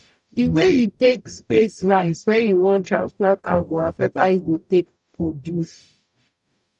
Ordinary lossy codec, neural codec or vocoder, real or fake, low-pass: none; codec, 44.1 kHz, 0.9 kbps, DAC; fake; 10.8 kHz